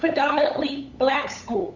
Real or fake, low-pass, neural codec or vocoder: fake; 7.2 kHz; codec, 16 kHz, 8 kbps, FunCodec, trained on LibriTTS, 25 frames a second